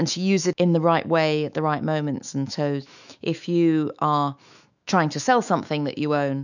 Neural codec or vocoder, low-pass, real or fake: autoencoder, 48 kHz, 128 numbers a frame, DAC-VAE, trained on Japanese speech; 7.2 kHz; fake